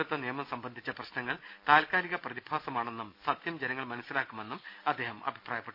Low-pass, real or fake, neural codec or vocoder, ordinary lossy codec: 5.4 kHz; real; none; Opus, 64 kbps